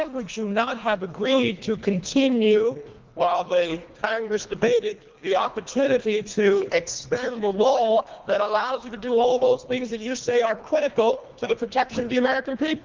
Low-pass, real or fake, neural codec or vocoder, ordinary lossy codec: 7.2 kHz; fake; codec, 24 kHz, 1.5 kbps, HILCodec; Opus, 32 kbps